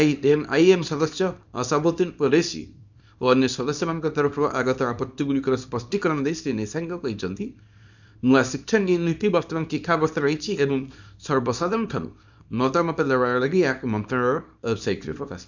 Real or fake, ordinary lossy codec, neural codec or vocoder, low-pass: fake; none; codec, 24 kHz, 0.9 kbps, WavTokenizer, small release; 7.2 kHz